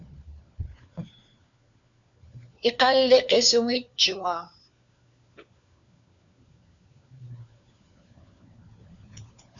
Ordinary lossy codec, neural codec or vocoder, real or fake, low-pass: MP3, 96 kbps; codec, 16 kHz, 4 kbps, FunCodec, trained on LibriTTS, 50 frames a second; fake; 7.2 kHz